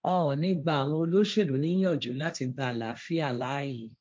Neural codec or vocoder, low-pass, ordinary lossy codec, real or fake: codec, 16 kHz, 1.1 kbps, Voila-Tokenizer; none; none; fake